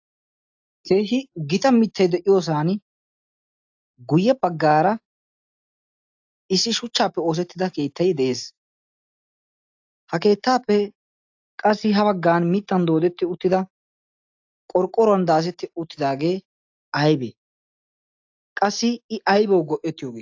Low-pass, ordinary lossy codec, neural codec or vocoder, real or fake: 7.2 kHz; AAC, 48 kbps; none; real